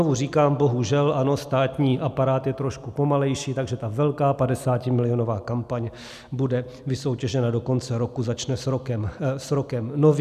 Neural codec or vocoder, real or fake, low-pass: none; real; 14.4 kHz